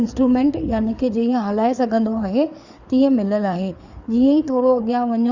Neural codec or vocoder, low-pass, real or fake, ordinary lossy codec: codec, 16 kHz, 4 kbps, FreqCodec, larger model; 7.2 kHz; fake; Opus, 64 kbps